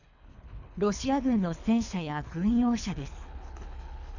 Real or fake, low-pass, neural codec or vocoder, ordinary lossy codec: fake; 7.2 kHz; codec, 24 kHz, 3 kbps, HILCodec; none